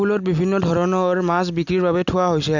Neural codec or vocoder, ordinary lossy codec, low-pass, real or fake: none; none; 7.2 kHz; real